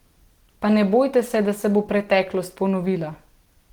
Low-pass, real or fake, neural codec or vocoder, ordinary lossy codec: 19.8 kHz; real; none; Opus, 16 kbps